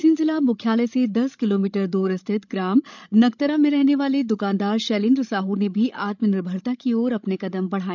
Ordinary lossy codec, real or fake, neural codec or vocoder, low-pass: none; fake; codec, 16 kHz, 16 kbps, FreqCodec, larger model; 7.2 kHz